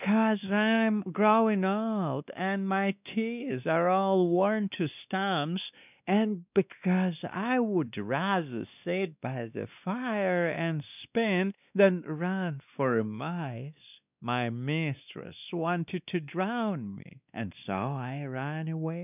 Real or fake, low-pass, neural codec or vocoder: fake; 3.6 kHz; codec, 16 kHz, 2 kbps, X-Codec, WavLM features, trained on Multilingual LibriSpeech